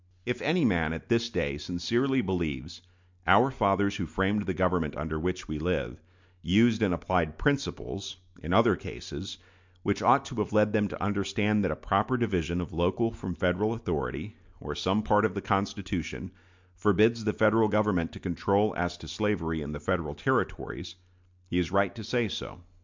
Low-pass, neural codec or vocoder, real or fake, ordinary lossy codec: 7.2 kHz; none; real; MP3, 64 kbps